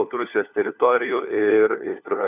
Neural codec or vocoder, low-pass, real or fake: codec, 16 kHz, 4 kbps, FunCodec, trained on LibriTTS, 50 frames a second; 3.6 kHz; fake